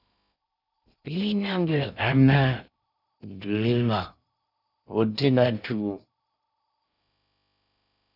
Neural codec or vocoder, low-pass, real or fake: codec, 16 kHz in and 24 kHz out, 0.6 kbps, FocalCodec, streaming, 2048 codes; 5.4 kHz; fake